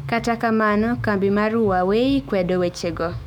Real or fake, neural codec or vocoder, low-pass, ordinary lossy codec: fake; autoencoder, 48 kHz, 128 numbers a frame, DAC-VAE, trained on Japanese speech; 19.8 kHz; none